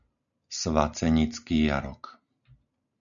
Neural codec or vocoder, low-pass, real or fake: none; 7.2 kHz; real